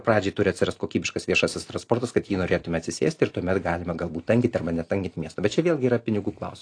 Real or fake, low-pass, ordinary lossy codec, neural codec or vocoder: real; 9.9 kHz; AAC, 48 kbps; none